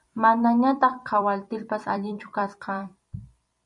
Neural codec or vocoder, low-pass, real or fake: vocoder, 44.1 kHz, 128 mel bands every 256 samples, BigVGAN v2; 10.8 kHz; fake